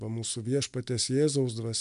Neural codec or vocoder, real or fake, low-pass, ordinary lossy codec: none; real; 10.8 kHz; MP3, 96 kbps